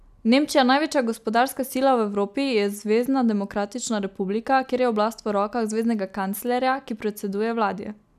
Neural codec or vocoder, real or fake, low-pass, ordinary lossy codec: none; real; 14.4 kHz; none